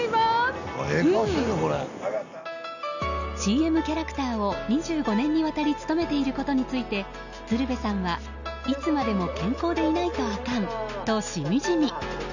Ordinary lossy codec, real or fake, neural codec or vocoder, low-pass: none; real; none; 7.2 kHz